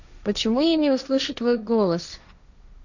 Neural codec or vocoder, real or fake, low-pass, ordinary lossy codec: codec, 16 kHz, 1.1 kbps, Voila-Tokenizer; fake; 7.2 kHz; none